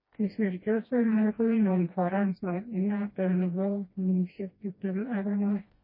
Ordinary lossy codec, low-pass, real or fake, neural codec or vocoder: MP3, 24 kbps; 5.4 kHz; fake; codec, 16 kHz, 1 kbps, FreqCodec, smaller model